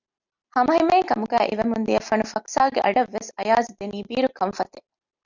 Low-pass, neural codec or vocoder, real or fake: 7.2 kHz; none; real